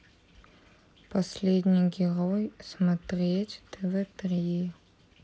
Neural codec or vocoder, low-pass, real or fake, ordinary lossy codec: none; none; real; none